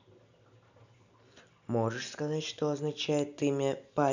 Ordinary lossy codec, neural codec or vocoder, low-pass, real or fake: none; none; 7.2 kHz; real